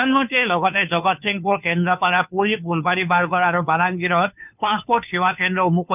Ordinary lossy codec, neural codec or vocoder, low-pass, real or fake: none; codec, 16 kHz, 2 kbps, FunCodec, trained on Chinese and English, 25 frames a second; 3.6 kHz; fake